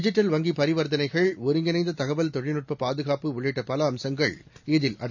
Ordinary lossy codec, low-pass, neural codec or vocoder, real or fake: none; 7.2 kHz; none; real